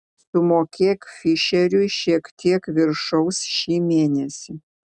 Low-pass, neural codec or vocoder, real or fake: 10.8 kHz; none; real